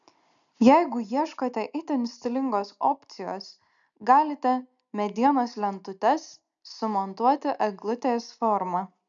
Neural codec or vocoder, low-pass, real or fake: none; 7.2 kHz; real